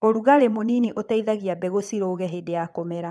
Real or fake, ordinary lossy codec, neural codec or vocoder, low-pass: fake; none; vocoder, 22.05 kHz, 80 mel bands, Vocos; none